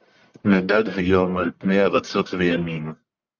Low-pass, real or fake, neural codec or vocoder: 7.2 kHz; fake; codec, 44.1 kHz, 1.7 kbps, Pupu-Codec